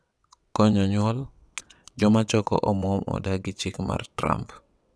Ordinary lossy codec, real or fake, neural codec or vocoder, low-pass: none; fake; vocoder, 22.05 kHz, 80 mel bands, WaveNeXt; none